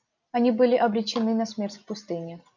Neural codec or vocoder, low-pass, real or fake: none; 7.2 kHz; real